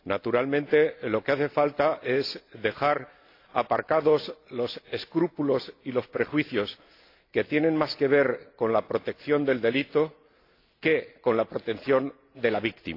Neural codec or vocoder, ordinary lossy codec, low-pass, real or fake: none; AAC, 32 kbps; 5.4 kHz; real